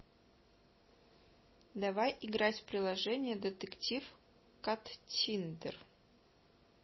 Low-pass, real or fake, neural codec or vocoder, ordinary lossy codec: 7.2 kHz; real; none; MP3, 24 kbps